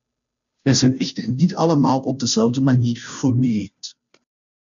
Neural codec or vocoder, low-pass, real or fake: codec, 16 kHz, 0.5 kbps, FunCodec, trained on Chinese and English, 25 frames a second; 7.2 kHz; fake